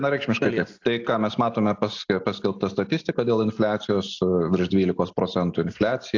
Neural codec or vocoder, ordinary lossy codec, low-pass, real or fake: none; AAC, 48 kbps; 7.2 kHz; real